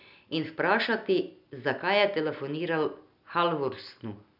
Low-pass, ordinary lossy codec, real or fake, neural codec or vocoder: 5.4 kHz; none; real; none